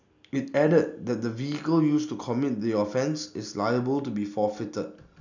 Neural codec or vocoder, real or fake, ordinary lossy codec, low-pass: none; real; none; 7.2 kHz